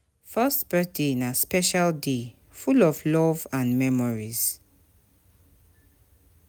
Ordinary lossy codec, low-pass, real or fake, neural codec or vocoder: none; none; real; none